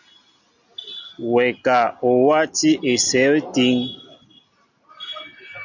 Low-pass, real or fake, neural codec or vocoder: 7.2 kHz; real; none